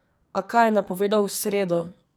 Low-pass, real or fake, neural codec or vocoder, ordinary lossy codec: none; fake; codec, 44.1 kHz, 2.6 kbps, SNAC; none